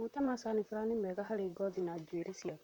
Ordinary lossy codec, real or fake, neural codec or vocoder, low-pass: none; fake; vocoder, 44.1 kHz, 128 mel bands, Pupu-Vocoder; 19.8 kHz